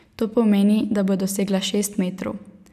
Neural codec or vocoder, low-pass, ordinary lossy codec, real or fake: none; 14.4 kHz; none; real